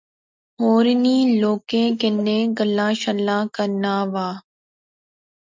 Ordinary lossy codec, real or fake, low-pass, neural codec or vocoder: MP3, 64 kbps; real; 7.2 kHz; none